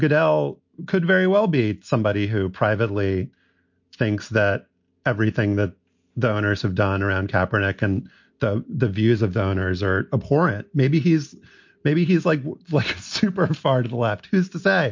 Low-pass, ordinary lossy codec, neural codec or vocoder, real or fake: 7.2 kHz; MP3, 48 kbps; none; real